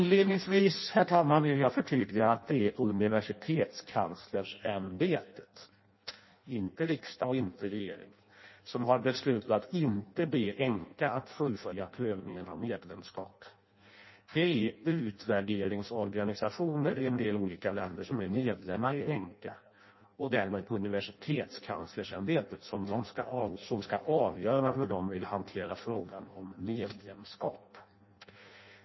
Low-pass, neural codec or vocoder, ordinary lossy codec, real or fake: 7.2 kHz; codec, 16 kHz in and 24 kHz out, 0.6 kbps, FireRedTTS-2 codec; MP3, 24 kbps; fake